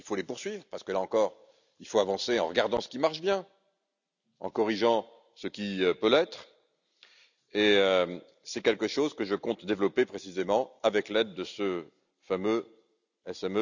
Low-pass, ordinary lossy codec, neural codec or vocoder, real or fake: 7.2 kHz; none; none; real